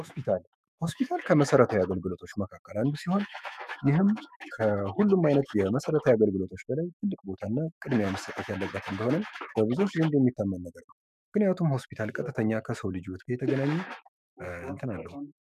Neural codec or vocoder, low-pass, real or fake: autoencoder, 48 kHz, 128 numbers a frame, DAC-VAE, trained on Japanese speech; 14.4 kHz; fake